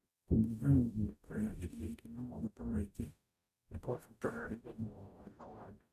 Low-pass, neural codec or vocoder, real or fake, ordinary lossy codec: 14.4 kHz; codec, 44.1 kHz, 0.9 kbps, DAC; fake; none